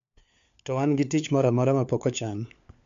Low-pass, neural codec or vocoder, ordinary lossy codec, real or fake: 7.2 kHz; codec, 16 kHz, 4 kbps, FunCodec, trained on LibriTTS, 50 frames a second; MP3, 64 kbps; fake